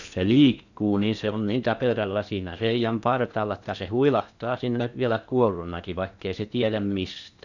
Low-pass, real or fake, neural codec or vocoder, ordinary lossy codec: 7.2 kHz; fake; codec, 16 kHz in and 24 kHz out, 0.8 kbps, FocalCodec, streaming, 65536 codes; none